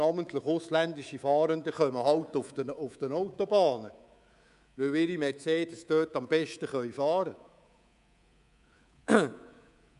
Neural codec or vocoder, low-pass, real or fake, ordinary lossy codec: codec, 24 kHz, 3.1 kbps, DualCodec; 10.8 kHz; fake; none